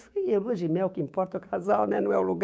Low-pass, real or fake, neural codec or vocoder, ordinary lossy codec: none; fake; codec, 16 kHz, 6 kbps, DAC; none